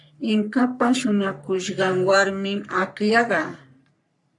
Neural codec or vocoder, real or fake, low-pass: codec, 44.1 kHz, 3.4 kbps, Pupu-Codec; fake; 10.8 kHz